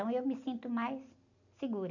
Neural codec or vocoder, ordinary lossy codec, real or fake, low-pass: none; none; real; 7.2 kHz